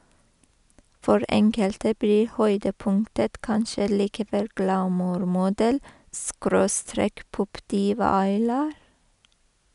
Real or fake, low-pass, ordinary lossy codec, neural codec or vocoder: real; 10.8 kHz; none; none